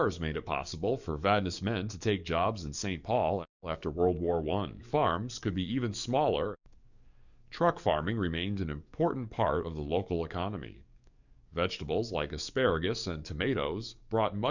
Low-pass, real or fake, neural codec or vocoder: 7.2 kHz; fake; codec, 44.1 kHz, 7.8 kbps, DAC